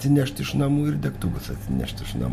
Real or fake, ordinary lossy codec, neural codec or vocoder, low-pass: real; MP3, 64 kbps; none; 14.4 kHz